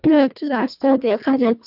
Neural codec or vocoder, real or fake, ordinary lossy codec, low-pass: codec, 24 kHz, 1.5 kbps, HILCodec; fake; none; 5.4 kHz